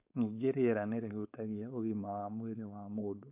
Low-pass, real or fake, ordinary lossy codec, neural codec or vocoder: 3.6 kHz; fake; AAC, 32 kbps; codec, 16 kHz, 4.8 kbps, FACodec